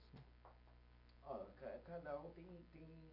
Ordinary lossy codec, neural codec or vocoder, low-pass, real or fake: AAC, 48 kbps; none; 5.4 kHz; real